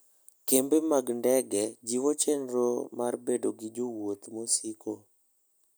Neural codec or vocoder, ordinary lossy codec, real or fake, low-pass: none; none; real; none